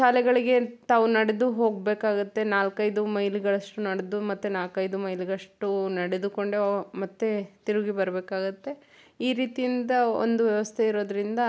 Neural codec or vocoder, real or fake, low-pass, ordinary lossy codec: none; real; none; none